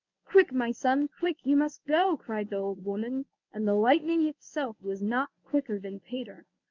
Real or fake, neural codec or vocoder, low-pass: fake; codec, 24 kHz, 0.9 kbps, WavTokenizer, medium speech release version 1; 7.2 kHz